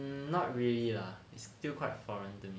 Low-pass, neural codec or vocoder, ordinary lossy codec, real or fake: none; none; none; real